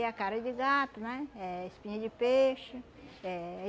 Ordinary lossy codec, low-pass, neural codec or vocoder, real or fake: none; none; none; real